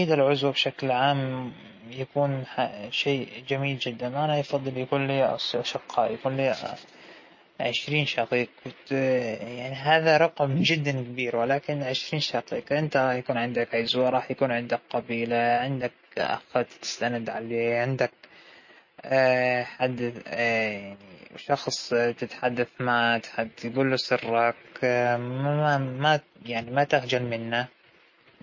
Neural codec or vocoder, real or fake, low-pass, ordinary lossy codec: none; real; 7.2 kHz; MP3, 32 kbps